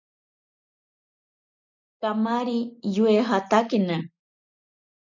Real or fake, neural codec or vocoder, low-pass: real; none; 7.2 kHz